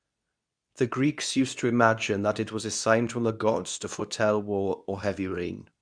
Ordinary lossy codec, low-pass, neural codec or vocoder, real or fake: none; 9.9 kHz; codec, 24 kHz, 0.9 kbps, WavTokenizer, medium speech release version 2; fake